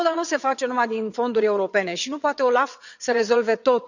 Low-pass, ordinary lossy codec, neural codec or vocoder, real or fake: 7.2 kHz; none; vocoder, 22.05 kHz, 80 mel bands, WaveNeXt; fake